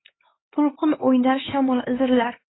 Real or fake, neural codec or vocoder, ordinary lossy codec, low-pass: fake; codec, 16 kHz, 4 kbps, X-Codec, HuBERT features, trained on LibriSpeech; AAC, 16 kbps; 7.2 kHz